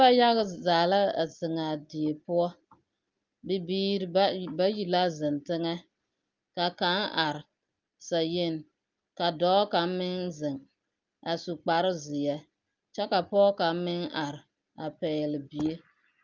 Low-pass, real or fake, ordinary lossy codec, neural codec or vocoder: 7.2 kHz; real; Opus, 24 kbps; none